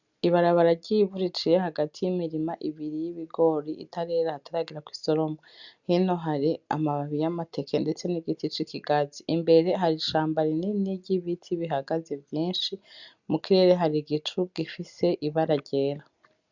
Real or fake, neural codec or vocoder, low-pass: real; none; 7.2 kHz